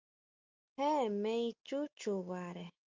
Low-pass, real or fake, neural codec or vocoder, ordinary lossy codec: 7.2 kHz; real; none; Opus, 24 kbps